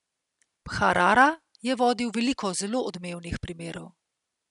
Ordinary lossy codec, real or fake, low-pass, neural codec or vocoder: none; real; 10.8 kHz; none